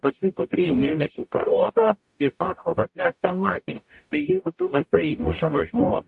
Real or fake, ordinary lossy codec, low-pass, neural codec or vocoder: fake; AAC, 64 kbps; 10.8 kHz; codec, 44.1 kHz, 0.9 kbps, DAC